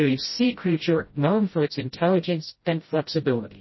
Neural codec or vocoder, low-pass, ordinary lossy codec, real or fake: codec, 16 kHz, 0.5 kbps, FreqCodec, smaller model; 7.2 kHz; MP3, 24 kbps; fake